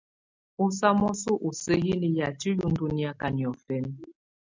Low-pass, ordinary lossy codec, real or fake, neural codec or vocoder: 7.2 kHz; MP3, 64 kbps; real; none